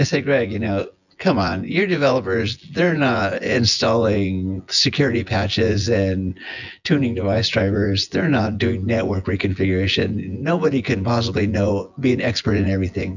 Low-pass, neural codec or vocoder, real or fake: 7.2 kHz; vocoder, 24 kHz, 100 mel bands, Vocos; fake